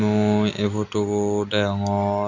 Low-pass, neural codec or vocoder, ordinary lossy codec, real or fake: 7.2 kHz; none; none; real